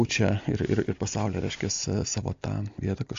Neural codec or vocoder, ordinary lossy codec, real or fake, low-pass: none; AAC, 48 kbps; real; 7.2 kHz